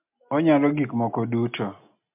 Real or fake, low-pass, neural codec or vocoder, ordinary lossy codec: real; 3.6 kHz; none; MP3, 32 kbps